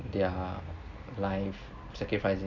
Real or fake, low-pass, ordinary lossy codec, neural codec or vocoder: real; 7.2 kHz; none; none